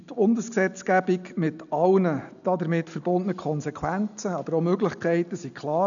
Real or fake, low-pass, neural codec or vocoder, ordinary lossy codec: real; 7.2 kHz; none; none